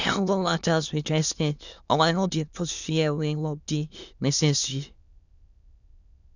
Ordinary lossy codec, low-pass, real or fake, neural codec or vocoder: none; 7.2 kHz; fake; autoencoder, 22.05 kHz, a latent of 192 numbers a frame, VITS, trained on many speakers